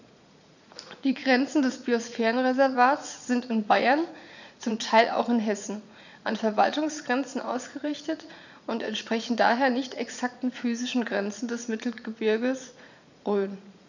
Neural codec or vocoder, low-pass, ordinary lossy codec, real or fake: vocoder, 44.1 kHz, 80 mel bands, Vocos; 7.2 kHz; none; fake